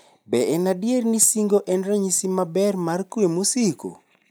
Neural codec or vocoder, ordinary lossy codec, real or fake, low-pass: none; none; real; none